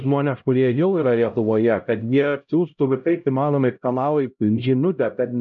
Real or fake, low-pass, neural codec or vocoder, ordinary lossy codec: fake; 7.2 kHz; codec, 16 kHz, 0.5 kbps, X-Codec, HuBERT features, trained on LibriSpeech; MP3, 96 kbps